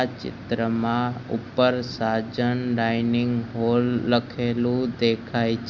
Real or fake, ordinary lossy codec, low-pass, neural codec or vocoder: real; Opus, 64 kbps; 7.2 kHz; none